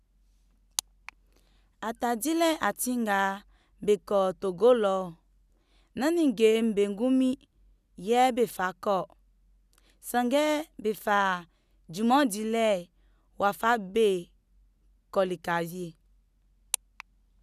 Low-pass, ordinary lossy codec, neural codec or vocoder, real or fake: 14.4 kHz; none; none; real